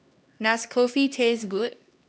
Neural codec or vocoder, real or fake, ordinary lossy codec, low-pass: codec, 16 kHz, 1 kbps, X-Codec, HuBERT features, trained on LibriSpeech; fake; none; none